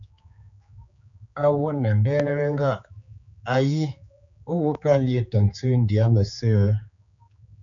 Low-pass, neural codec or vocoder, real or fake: 7.2 kHz; codec, 16 kHz, 4 kbps, X-Codec, HuBERT features, trained on general audio; fake